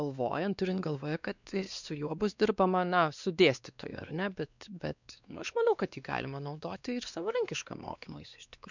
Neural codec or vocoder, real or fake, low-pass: codec, 16 kHz, 2 kbps, X-Codec, WavLM features, trained on Multilingual LibriSpeech; fake; 7.2 kHz